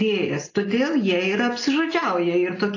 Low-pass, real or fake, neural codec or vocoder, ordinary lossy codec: 7.2 kHz; real; none; AAC, 32 kbps